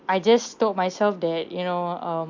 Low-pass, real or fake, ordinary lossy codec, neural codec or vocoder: 7.2 kHz; real; MP3, 64 kbps; none